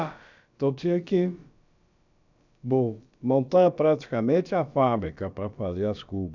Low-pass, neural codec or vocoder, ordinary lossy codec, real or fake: 7.2 kHz; codec, 16 kHz, about 1 kbps, DyCAST, with the encoder's durations; none; fake